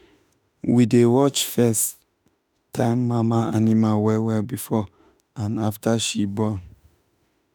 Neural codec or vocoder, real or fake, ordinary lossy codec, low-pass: autoencoder, 48 kHz, 32 numbers a frame, DAC-VAE, trained on Japanese speech; fake; none; none